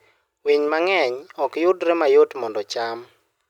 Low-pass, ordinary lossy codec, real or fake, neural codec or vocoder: 19.8 kHz; none; real; none